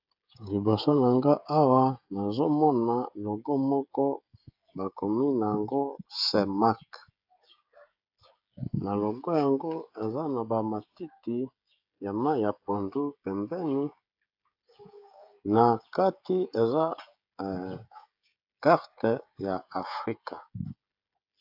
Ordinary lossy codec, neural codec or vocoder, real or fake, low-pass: AAC, 48 kbps; codec, 16 kHz, 16 kbps, FreqCodec, smaller model; fake; 5.4 kHz